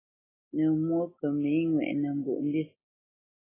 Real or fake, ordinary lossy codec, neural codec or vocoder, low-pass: real; AAC, 16 kbps; none; 3.6 kHz